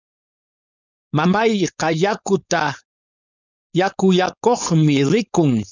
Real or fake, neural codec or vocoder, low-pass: fake; codec, 16 kHz, 4.8 kbps, FACodec; 7.2 kHz